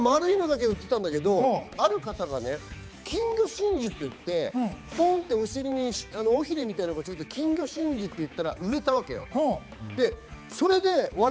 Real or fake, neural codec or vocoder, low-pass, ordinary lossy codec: fake; codec, 16 kHz, 4 kbps, X-Codec, HuBERT features, trained on balanced general audio; none; none